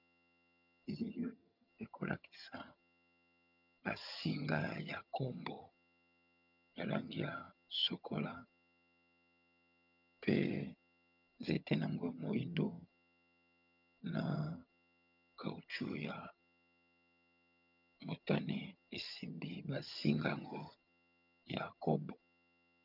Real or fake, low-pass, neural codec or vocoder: fake; 5.4 kHz; vocoder, 22.05 kHz, 80 mel bands, HiFi-GAN